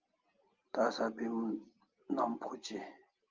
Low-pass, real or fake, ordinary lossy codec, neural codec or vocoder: 7.2 kHz; real; Opus, 32 kbps; none